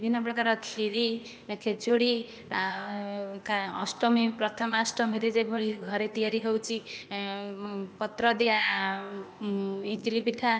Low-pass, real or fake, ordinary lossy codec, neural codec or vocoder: none; fake; none; codec, 16 kHz, 0.8 kbps, ZipCodec